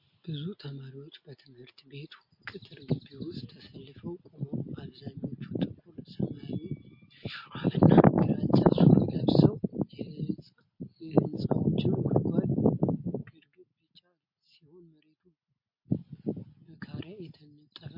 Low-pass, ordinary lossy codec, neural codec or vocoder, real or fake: 5.4 kHz; MP3, 32 kbps; none; real